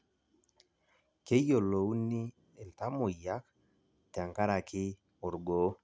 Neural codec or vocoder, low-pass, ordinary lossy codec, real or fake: none; none; none; real